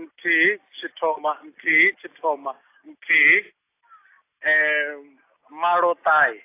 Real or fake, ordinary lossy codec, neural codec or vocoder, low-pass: real; AAC, 24 kbps; none; 3.6 kHz